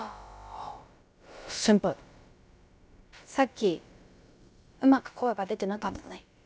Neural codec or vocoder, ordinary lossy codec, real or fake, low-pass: codec, 16 kHz, about 1 kbps, DyCAST, with the encoder's durations; none; fake; none